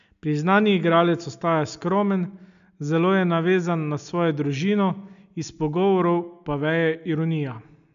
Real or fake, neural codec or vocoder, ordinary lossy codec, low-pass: real; none; none; 7.2 kHz